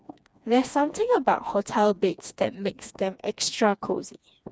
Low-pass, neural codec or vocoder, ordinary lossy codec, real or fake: none; codec, 16 kHz, 2 kbps, FreqCodec, smaller model; none; fake